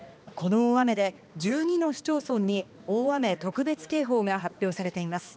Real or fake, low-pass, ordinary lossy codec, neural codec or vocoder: fake; none; none; codec, 16 kHz, 2 kbps, X-Codec, HuBERT features, trained on balanced general audio